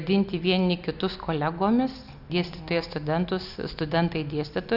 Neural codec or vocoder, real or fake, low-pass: none; real; 5.4 kHz